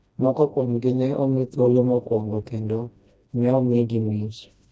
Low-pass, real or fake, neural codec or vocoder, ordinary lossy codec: none; fake; codec, 16 kHz, 1 kbps, FreqCodec, smaller model; none